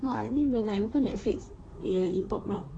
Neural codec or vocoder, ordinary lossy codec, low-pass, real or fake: codec, 24 kHz, 1 kbps, SNAC; AAC, 32 kbps; 9.9 kHz; fake